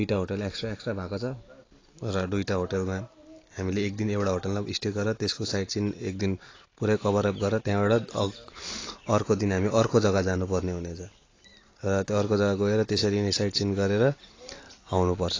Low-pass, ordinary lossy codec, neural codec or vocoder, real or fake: 7.2 kHz; AAC, 32 kbps; none; real